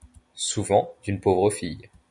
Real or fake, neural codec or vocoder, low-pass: real; none; 10.8 kHz